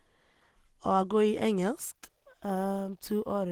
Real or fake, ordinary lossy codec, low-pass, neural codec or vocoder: real; Opus, 16 kbps; 19.8 kHz; none